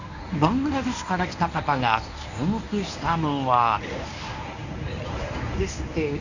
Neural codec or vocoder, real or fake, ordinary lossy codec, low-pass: codec, 24 kHz, 0.9 kbps, WavTokenizer, medium speech release version 1; fake; none; 7.2 kHz